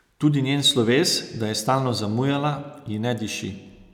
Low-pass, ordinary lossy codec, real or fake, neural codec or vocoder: 19.8 kHz; none; real; none